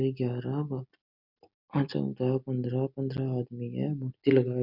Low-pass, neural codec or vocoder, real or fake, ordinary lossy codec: 5.4 kHz; none; real; none